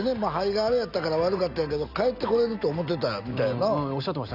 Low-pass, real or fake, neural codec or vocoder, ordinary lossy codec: 5.4 kHz; real; none; none